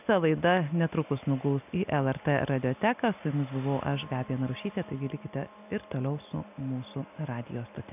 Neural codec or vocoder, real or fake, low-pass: none; real; 3.6 kHz